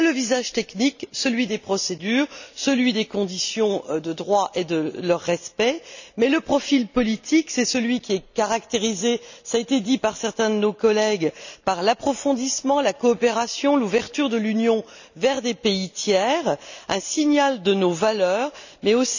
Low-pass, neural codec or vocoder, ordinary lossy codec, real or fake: 7.2 kHz; none; none; real